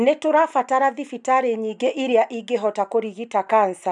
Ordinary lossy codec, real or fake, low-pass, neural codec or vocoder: none; real; 10.8 kHz; none